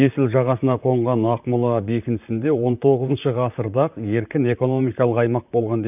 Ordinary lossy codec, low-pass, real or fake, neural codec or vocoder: none; 3.6 kHz; fake; vocoder, 44.1 kHz, 128 mel bands, Pupu-Vocoder